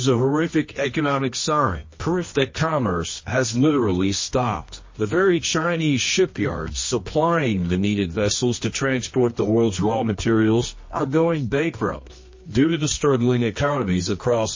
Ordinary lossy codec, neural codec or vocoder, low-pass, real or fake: MP3, 32 kbps; codec, 24 kHz, 0.9 kbps, WavTokenizer, medium music audio release; 7.2 kHz; fake